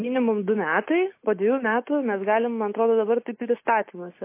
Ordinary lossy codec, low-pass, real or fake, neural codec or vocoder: MP3, 24 kbps; 3.6 kHz; real; none